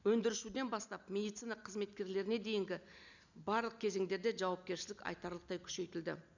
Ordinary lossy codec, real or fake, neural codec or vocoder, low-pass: none; real; none; 7.2 kHz